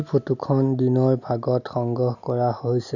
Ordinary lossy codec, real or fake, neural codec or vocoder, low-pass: none; real; none; 7.2 kHz